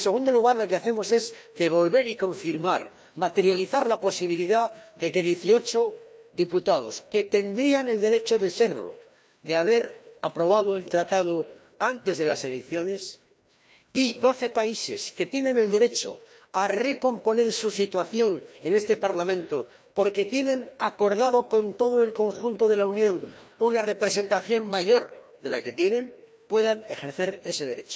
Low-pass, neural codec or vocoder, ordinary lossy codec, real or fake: none; codec, 16 kHz, 1 kbps, FreqCodec, larger model; none; fake